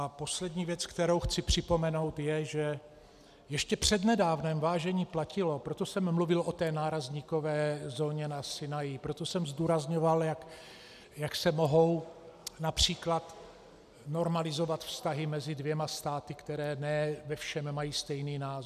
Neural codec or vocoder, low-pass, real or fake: none; 14.4 kHz; real